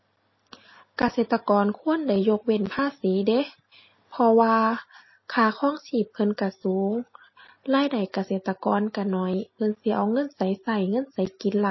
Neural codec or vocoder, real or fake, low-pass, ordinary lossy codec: none; real; 7.2 kHz; MP3, 24 kbps